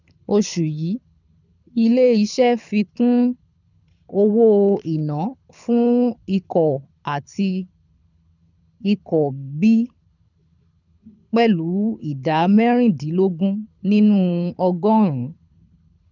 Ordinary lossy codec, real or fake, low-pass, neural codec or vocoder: none; fake; 7.2 kHz; codec, 24 kHz, 6 kbps, HILCodec